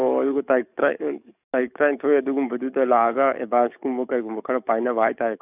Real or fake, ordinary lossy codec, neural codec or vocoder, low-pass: fake; none; codec, 44.1 kHz, 7.8 kbps, DAC; 3.6 kHz